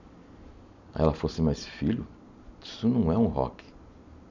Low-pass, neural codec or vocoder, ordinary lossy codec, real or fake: 7.2 kHz; none; none; real